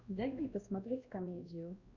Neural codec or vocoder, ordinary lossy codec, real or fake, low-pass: codec, 16 kHz, 1 kbps, X-Codec, WavLM features, trained on Multilingual LibriSpeech; Opus, 64 kbps; fake; 7.2 kHz